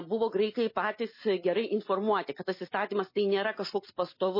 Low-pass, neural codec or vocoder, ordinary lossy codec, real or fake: 5.4 kHz; none; MP3, 24 kbps; real